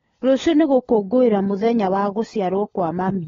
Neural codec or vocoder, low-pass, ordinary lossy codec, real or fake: codec, 16 kHz, 16 kbps, FunCodec, trained on LibriTTS, 50 frames a second; 7.2 kHz; AAC, 24 kbps; fake